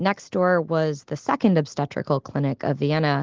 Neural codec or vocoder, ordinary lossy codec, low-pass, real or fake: none; Opus, 16 kbps; 7.2 kHz; real